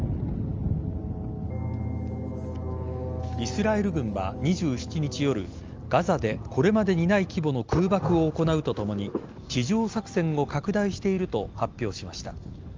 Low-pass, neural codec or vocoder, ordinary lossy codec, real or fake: 7.2 kHz; none; Opus, 24 kbps; real